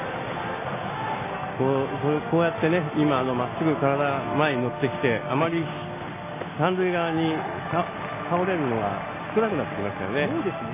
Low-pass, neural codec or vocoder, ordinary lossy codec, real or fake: 3.6 kHz; none; MP3, 16 kbps; real